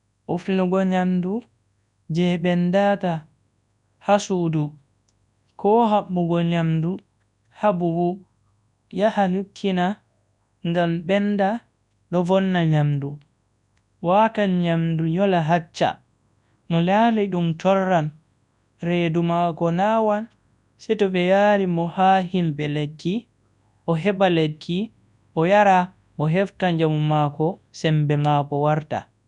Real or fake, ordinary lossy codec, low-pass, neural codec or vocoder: fake; none; 10.8 kHz; codec, 24 kHz, 0.9 kbps, WavTokenizer, large speech release